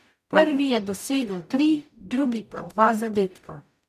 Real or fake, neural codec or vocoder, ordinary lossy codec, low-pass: fake; codec, 44.1 kHz, 0.9 kbps, DAC; none; 14.4 kHz